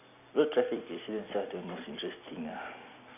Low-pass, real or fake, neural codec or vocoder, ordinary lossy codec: 3.6 kHz; real; none; none